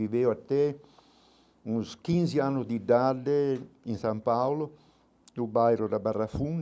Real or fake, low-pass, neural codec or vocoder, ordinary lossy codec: real; none; none; none